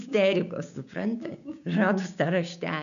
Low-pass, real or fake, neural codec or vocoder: 7.2 kHz; fake; codec, 16 kHz, 6 kbps, DAC